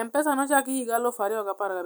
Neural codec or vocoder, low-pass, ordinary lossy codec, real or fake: none; none; none; real